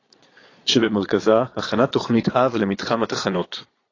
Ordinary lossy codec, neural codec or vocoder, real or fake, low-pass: AAC, 32 kbps; vocoder, 22.05 kHz, 80 mel bands, Vocos; fake; 7.2 kHz